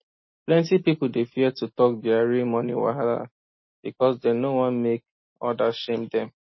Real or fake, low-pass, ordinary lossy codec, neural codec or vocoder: real; 7.2 kHz; MP3, 24 kbps; none